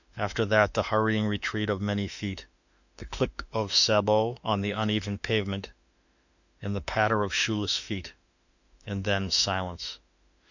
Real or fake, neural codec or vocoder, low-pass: fake; autoencoder, 48 kHz, 32 numbers a frame, DAC-VAE, trained on Japanese speech; 7.2 kHz